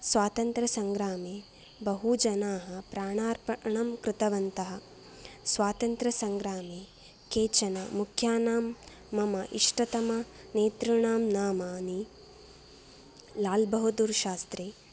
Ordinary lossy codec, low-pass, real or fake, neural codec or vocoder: none; none; real; none